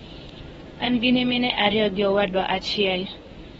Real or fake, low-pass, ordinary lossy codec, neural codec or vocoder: fake; 10.8 kHz; AAC, 24 kbps; codec, 24 kHz, 0.9 kbps, WavTokenizer, medium speech release version 1